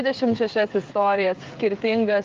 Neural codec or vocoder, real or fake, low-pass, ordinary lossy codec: codec, 16 kHz, 8 kbps, FreqCodec, smaller model; fake; 7.2 kHz; Opus, 32 kbps